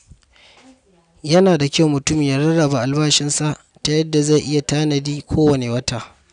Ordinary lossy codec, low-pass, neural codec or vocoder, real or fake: none; 9.9 kHz; none; real